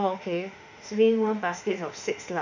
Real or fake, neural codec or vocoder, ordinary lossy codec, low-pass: fake; autoencoder, 48 kHz, 32 numbers a frame, DAC-VAE, trained on Japanese speech; none; 7.2 kHz